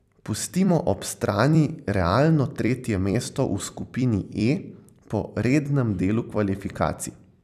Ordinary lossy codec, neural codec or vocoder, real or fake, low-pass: none; vocoder, 44.1 kHz, 128 mel bands every 256 samples, BigVGAN v2; fake; 14.4 kHz